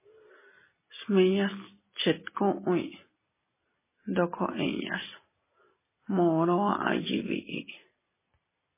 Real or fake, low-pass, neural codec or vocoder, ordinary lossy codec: real; 3.6 kHz; none; MP3, 16 kbps